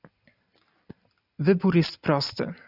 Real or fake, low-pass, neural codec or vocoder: real; 5.4 kHz; none